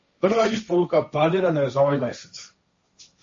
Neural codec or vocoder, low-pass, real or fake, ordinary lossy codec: codec, 16 kHz, 1.1 kbps, Voila-Tokenizer; 7.2 kHz; fake; MP3, 32 kbps